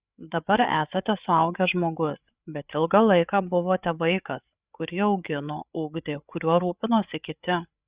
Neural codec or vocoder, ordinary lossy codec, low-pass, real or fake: codec, 16 kHz, 8 kbps, FreqCodec, larger model; Opus, 64 kbps; 3.6 kHz; fake